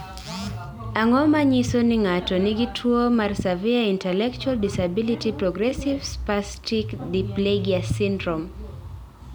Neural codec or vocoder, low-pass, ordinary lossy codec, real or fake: none; none; none; real